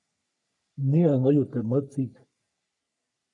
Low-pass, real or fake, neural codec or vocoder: 10.8 kHz; fake; codec, 44.1 kHz, 3.4 kbps, Pupu-Codec